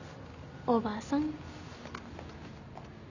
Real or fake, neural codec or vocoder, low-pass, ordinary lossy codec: real; none; 7.2 kHz; none